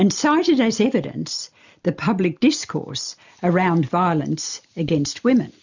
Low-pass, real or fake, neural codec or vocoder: 7.2 kHz; real; none